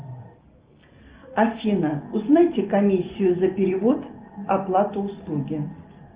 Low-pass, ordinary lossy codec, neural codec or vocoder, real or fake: 3.6 kHz; Opus, 32 kbps; none; real